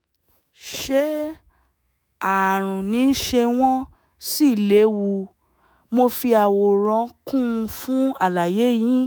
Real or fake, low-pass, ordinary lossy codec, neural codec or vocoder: fake; none; none; autoencoder, 48 kHz, 128 numbers a frame, DAC-VAE, trained on Japanese speech